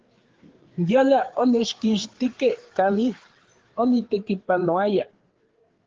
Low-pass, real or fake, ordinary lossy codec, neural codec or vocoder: 7.2 kHz; fake; Opus, 16 kbps; codec, 16 kHz, 4 kbps, FreqCodec, larger model